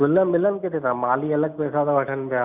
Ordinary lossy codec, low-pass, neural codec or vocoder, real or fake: none; 3.6 kHz; none; real